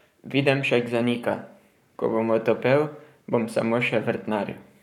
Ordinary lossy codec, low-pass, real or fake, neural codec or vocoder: none; 19.8 kHz; fake; codec, 44.1 kHz, 7.8 kbps, Pupu-Codec